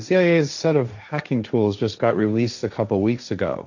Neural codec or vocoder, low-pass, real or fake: codec, 16 kHz, 1.1 kbps, Voila-Tokenizer; 7.2 kHz; fake